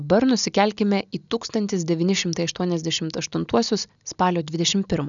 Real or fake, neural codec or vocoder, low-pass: real; none; 7.2 kHz